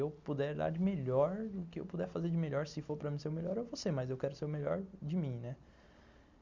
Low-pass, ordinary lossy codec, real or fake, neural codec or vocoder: 7.2 kHz; Opus, 64 kbps; real; none